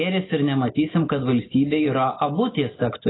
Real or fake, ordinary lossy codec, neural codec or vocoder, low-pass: real; AAC, 16 kbps; none; 7.2 kHz